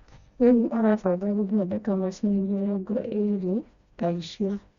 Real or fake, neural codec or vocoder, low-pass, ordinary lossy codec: fake; codec, 16 kHz, 1 kbps, FreqCodec, smaller model; 7.2 kHz; Opus, 64 kbps